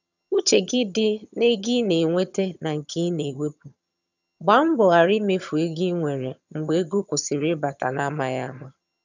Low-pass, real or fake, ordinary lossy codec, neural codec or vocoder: 7.2 kHz; fake; none; vocoder, 22.05 kHz, 80 mel bands, HiFi-GAN